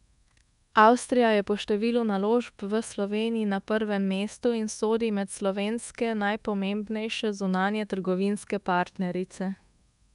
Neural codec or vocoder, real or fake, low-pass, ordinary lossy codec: codec, 24 kHz, 1.2 kbps, DualCodec; fake; 10.8 kHz; none